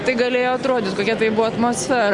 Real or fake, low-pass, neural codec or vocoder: real; 10.8 kHz; none